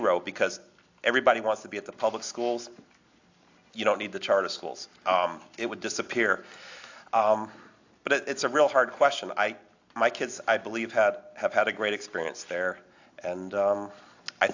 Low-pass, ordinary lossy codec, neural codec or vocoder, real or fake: 7.2 kHz; AAC, 48 kbps; none; real